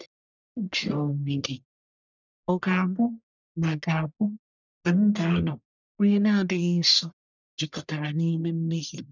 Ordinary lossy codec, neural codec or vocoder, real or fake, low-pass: none; codec, 44.1 kHz, 1.7 kbps, Pupu-Codec; fake; 7.2 kHz